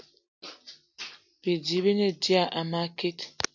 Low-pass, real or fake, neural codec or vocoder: 7.2 kHz; real; none